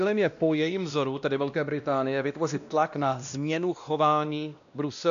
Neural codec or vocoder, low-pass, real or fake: codec, 16 kHz, 1 kbps, X-Codec, WavLM features, trained on Multilingual LibriSpeech; 7.2 kHz; fake